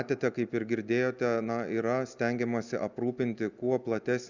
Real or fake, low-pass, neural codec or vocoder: real; 7.2 kHz; none